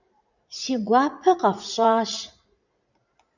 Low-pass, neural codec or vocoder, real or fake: 7.2 kHz; vocoder, 44.1 kHz, 80 mel bands, Vocos; fake